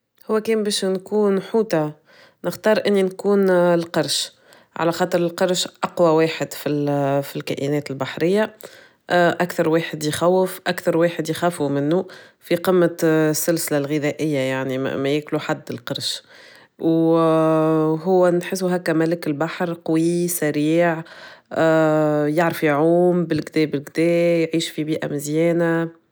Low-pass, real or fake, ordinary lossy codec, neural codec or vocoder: none; real; none; none